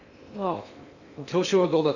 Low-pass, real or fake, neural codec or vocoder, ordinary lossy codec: 7.2 kHz; fake; codec, 16 kHz in and 24 kHz out, 0.6 kbps, FocalCodec, streaming, 2048 codes; none